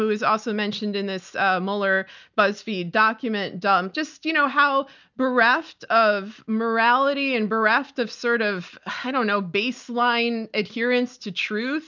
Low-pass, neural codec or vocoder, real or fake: 7.2 kHz; none; real